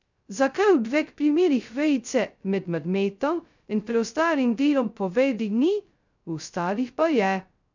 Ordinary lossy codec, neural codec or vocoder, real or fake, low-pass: none; codec, 16 kHz, 0.2 kbps, FocalCodec; fake; 7.2 kHz